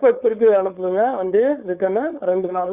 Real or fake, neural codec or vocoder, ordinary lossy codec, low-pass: fake; codec, 16 kHz, 4.8 kbps, FACodec; Opus, 64 kbps; 3.6 kHz